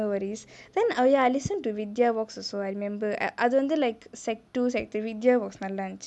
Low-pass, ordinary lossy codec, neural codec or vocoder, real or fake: none; none; none; real